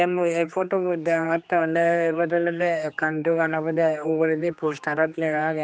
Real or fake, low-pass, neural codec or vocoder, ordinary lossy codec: fake; none; codec, 16 kHz, 2 kbps, X-Codec, HuBERT features, trained on general audio; none